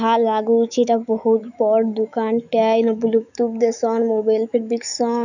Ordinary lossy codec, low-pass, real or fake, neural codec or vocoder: none; 7.2 kHz; fake; autoencoder, 48 kHz, 128 numbers a frame, DAC-VAE, trained on Japanese speech